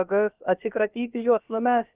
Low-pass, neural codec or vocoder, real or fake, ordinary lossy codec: 3.6 kHz; codec, 16 kHz, about 1 kbps, DyCAST, with the encoder's durations; fake; Opus, 32 kbps